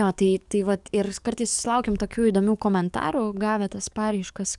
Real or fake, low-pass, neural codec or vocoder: fake; 10.8 kHz; codec, 44.1 kHz, 7.8 kbps, DAC